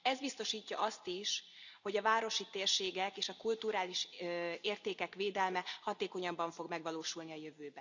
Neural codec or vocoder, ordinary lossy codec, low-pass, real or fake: none; none; 7.2 kHz; real